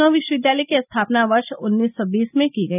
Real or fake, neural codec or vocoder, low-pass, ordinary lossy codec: real; none; 3.6 kHz; none